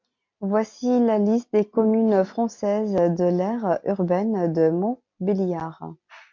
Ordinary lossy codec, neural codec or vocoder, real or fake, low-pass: MP3, 48 kbps; none; real; 7.2 kHz